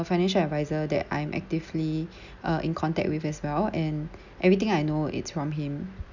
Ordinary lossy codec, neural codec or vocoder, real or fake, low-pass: none; none; real; 7.2 kHz